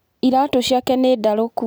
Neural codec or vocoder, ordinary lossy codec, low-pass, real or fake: none; none; none; real